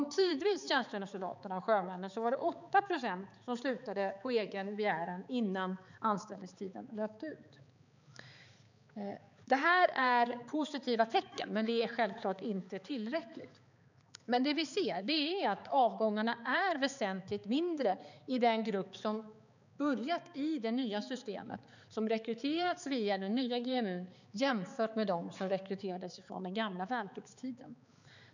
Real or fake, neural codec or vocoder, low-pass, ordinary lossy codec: fake; codec, 16 kHz, 4 kbps, X-Codec, HuBERT features, trained on balanced general audio; 7.2 kHz; none